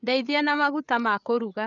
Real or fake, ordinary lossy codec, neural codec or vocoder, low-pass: fake; none; codec, 16 kHz, 8 kbps, FreqCodec, larger model; 7.2 kHz